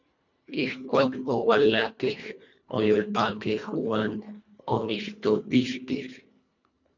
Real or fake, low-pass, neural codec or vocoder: fake; 7.2 kHz; codec, 24 kHz, 1.5 kbps, HILCodec